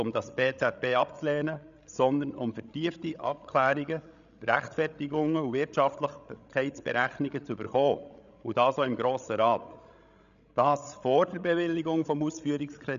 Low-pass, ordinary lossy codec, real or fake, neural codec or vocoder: 7.2 kHz; MP3, 96 kbps; fake; codec, 16 kHz, 16 kbps, FreqCodec, larger model